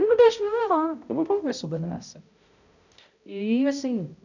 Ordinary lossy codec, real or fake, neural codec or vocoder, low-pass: none; fake; codec, 16 kHz, 0.5 kbps, X-Codec, HuBERT features, trained on balanced general audio; 7.2 kHz